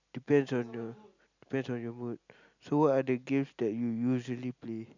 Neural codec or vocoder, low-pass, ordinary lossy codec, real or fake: none; 7.2 kHz; none; real